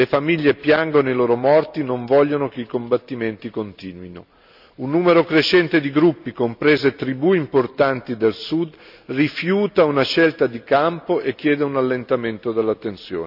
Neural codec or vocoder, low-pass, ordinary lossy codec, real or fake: none; 5.4 kHz; none; real